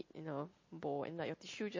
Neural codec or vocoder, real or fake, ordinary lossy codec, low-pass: none; real; MP3, 32 kbps; 7.2 kHz